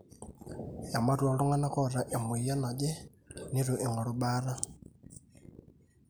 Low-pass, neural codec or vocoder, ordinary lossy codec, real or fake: none; none; none; real